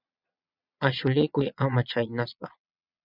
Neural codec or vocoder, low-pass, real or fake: vocoder, 22.05 kHz, 80 mel bands, Vocos; 5.4 kHz; fake